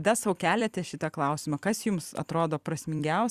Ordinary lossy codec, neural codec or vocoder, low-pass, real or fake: Opus, 64 kbps; vocoder, 44.1 kHz, 128 mel bands every 256 samples, BigVGAN v2; 14.4 kHz; fake